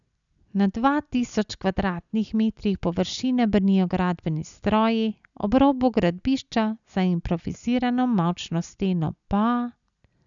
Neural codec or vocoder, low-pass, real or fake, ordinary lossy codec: none; 7.2 kHz; real; none